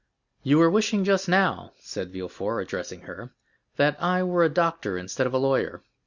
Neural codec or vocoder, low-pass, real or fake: none; 7.2 kHz; real